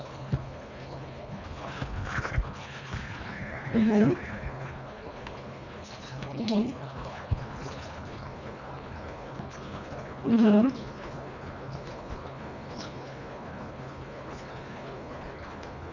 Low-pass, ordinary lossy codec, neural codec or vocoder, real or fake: 7.2 kHz; none; codec, 24 kHz, 1.5 kbps, HILCodec; fake